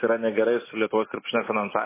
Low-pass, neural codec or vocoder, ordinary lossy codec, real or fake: 3.6 kHz; none; MP3, 16 kbps; real